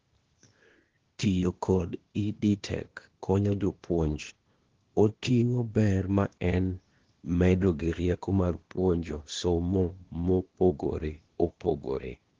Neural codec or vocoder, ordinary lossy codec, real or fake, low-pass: codec, 16 kHz, 0.8 kbps, ZipCodec; Opus, 16 kbps; fake; 7.2 kHz